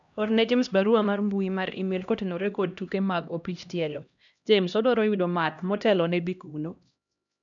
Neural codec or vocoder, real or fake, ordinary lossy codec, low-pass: codec, 16 kHz, 1 kbps, X-Codec, HuBERT features, trained on LibriSpeech; fake; none; 7.2 kHz